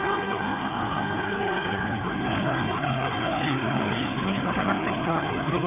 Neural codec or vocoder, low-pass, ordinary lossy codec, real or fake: codec, 16 kHz, 4 kbps, FreqCodec, larger model; 3.6 kHz; none; fake